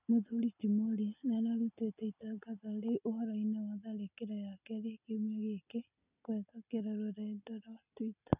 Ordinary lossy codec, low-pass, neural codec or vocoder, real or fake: none; 3.6 kHz; none; real